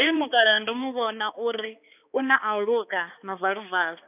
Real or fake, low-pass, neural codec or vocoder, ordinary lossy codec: fake; 3.6 kHz; codec, 16 kHz, 2 kbps, X-Codec, HuBERT features, trained on balanced general audio; none